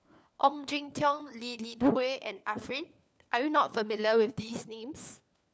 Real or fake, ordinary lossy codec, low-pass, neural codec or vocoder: fake; none; none; codec, 16 kHz, 4 kbps, FunCodec, trained on LibriTTS, 50 frames a second